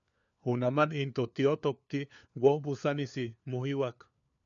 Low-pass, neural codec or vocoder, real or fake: 7.2 kHz; codec, 16 kHz, 4 kbps, FunCodec, trained on LibriTTS, 50 frames a second; fake